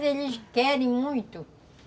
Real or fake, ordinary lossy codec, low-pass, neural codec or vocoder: real; none; none; none